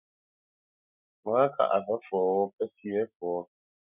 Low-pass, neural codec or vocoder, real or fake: 3.6 kHz; none; real